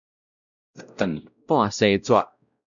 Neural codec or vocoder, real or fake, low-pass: codec, 16 kHz, 0.5 kbps, X-Codec, WavLM features, trained on Multilingual LibriSpeech; fake; 7.2 kHz